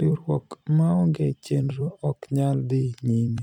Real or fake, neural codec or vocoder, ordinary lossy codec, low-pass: fake; vocoder, 44.1 kHz, 128 mel bands every 256 samples, BigVGAN v2; none; 19.8 kHz